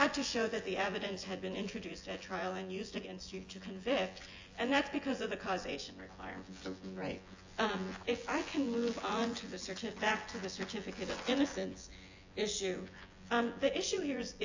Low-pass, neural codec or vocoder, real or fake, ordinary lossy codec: 7.2 kHz; vocoder, 24 kHz, 100 mel bands, Vocos; fake; AAC, 48 kbps